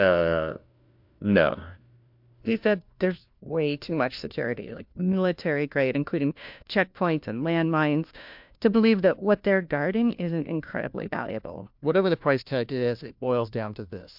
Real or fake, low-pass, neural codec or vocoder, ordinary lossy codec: fake; 5.4 kHz; codec, 16 kHz, 1 kbps, FunCodec, trained on LibriTTS, 50 frames a second; MP3, 48 kbps